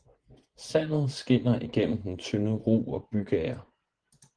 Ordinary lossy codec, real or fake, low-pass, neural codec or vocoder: Opus, 16 kbps; fake; 9.9 kHz; vocoder, 24 kHz, 100 mel bands, Vocos